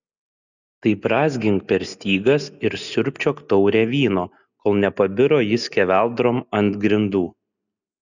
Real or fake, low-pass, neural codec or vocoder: real; 7.2 kHz; none